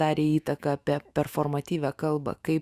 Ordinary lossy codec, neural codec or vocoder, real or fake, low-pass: Opus, 64 kbps; autoencoder, 48 kHz, 128 numbers a frame, DAC-VAE, trained on Japanese speech; fake; 14.4 kHz